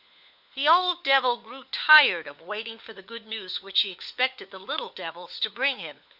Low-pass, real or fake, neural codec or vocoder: 5.4 kHz; fake; codec, 16 kHz, 4 kbps, FunCodec, trained on LibriTTS, 50 frames a second